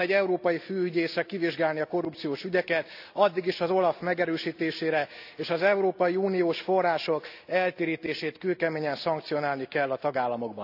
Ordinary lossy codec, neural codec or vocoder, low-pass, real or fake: AAC, 48 kbps; none; 5.4 kHz; real